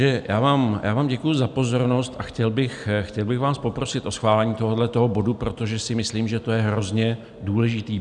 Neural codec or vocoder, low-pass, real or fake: none; 10.8 kHz; real